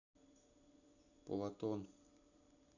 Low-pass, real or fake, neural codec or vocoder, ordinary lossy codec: 7.2 kHz; real; none; none